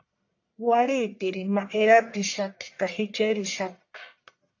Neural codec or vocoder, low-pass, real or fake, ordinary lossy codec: codec, 44.1 kHz, 1.7 kbps, Pupu-Codec; 7.2 kHz; fake; AAC, 48 kbps